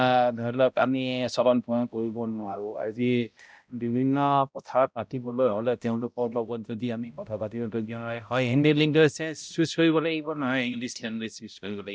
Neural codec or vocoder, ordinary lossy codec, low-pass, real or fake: codec, 16 kHz, 0.5 kbps, X-Codec, HuBERT features, trained on balanced general audio; none; none; fake